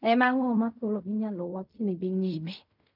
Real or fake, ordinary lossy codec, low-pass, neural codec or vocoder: fake; none; 5.4 kHz; codec, 16 kHz in and 24 kHz out, 0.4 kbps, LongCat-Audio-Codec, fine tuned four codebook decoder